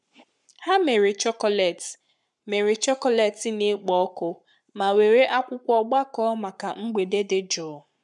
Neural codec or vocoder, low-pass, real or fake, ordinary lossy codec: codec, 44.1 kHz, 7.8 kbps, Pupu-Codec; 10.8 kHz; fake; none